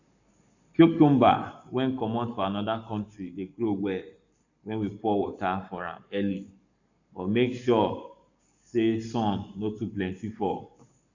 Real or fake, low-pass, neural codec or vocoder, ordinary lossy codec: fake; 7.2 kHz; codec, 44.1 kHz, 7.8 kbps, Pupu-Codec; none